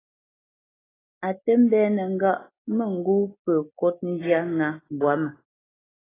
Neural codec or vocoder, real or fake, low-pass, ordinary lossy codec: none; real; 3.6 kHz; AAC, 16 kbps